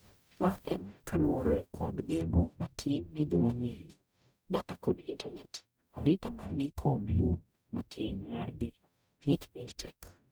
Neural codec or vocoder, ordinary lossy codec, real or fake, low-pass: codec, 44.1 kHz, 0.9 kbps, DAC; none; fake; none